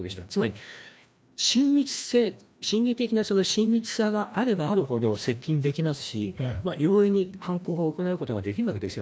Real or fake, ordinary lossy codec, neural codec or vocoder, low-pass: fake; none; codec, 16 kHz, 1 kbps, FreqCodec, larger model; none